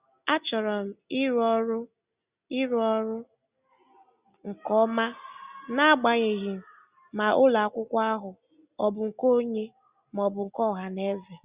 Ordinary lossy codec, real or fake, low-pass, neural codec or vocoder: Opus, 64 kbps; real; 3.6 kHz; none